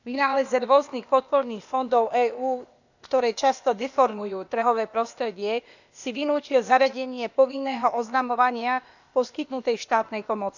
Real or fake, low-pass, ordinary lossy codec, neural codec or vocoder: fake; 7.2 kHz; none; codec, 16 kHz, 0.8 kbps, ZipCodec